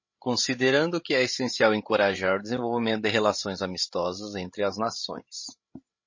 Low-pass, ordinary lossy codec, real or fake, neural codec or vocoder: 7.2 kHz; MP3, 32 kbps; fake; codec, 16 kHz, 16 kbps, FreqCodec, larger model